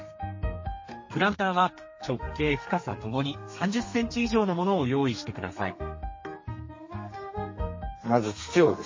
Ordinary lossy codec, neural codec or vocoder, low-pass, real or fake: MP3, 32 kbps; codec, 32 kHz, 1.9 kbps, SNAC; 7.2 kHz; fake